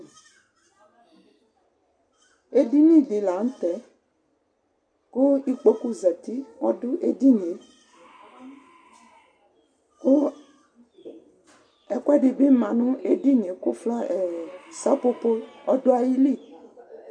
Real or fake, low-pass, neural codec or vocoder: real; 9.9 kHz; none